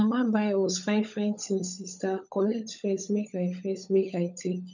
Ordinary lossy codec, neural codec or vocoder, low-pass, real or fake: none; codec, 16 kHz, 16 kbps, FunCodec, trained on LibriTTS, 50 frames a second; 7.2 kHz; fake